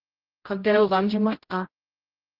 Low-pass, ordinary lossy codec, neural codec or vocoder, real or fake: 5.4 kHz; Opus, 16 kbps; codec, 16 kHz, 0.5 kbps, X-Codec, HuBERT features, trained on general audio; fake